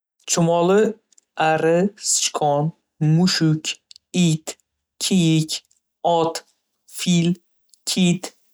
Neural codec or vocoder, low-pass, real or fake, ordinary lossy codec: none; none; real; none